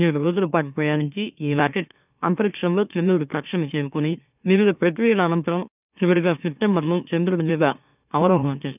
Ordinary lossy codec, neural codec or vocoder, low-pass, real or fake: none; autoencoder, 44.1 kHz, a latent of 192 numbers a frame, MeloTTS; 3.6 kHz; fake